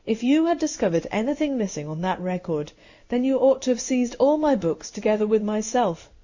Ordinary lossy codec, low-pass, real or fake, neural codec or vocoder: Opus, 64 kbps; 7.2 kHz; fake; codec, 16 kHz in and 24 kHz out, 1 kbps, XY-Tokenizer